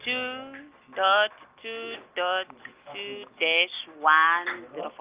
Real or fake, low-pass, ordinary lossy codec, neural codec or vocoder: real; 3.6 kHz; Opus, 32 kbps; none